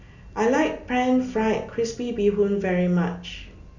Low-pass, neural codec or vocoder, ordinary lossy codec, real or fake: 7.2 kHz; none; none; real